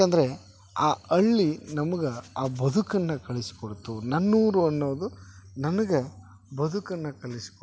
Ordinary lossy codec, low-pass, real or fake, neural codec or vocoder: none; none; real; none